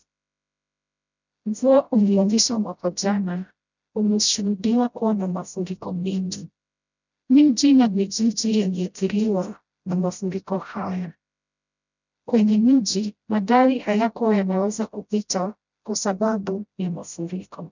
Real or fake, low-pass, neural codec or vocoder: fake; 7.2 kHz; codec, 16 kHz, 0.5 kbps, FreqCodec, smaller model